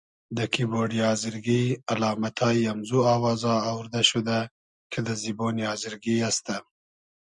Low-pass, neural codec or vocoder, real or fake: 10.8 kHz; none; real